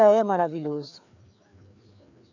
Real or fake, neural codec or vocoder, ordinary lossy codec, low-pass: fake; codec, 16 kHz, 2 kbps, FreqCodec, larger model; none; 7.2 kHz